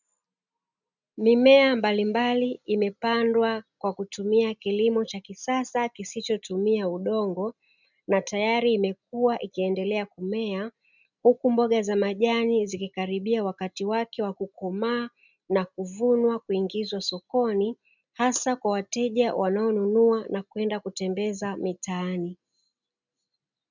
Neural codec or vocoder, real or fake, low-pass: none; real; 7.2 kHz